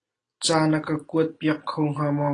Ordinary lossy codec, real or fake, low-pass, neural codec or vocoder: AAC, 32 kbps; real; 9.9 kHz; none